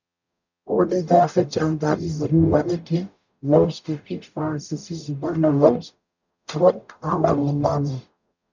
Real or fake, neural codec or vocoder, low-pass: fake; codec, 44.1 kHz, 0.9 kbps, DAC; 7.2 kHz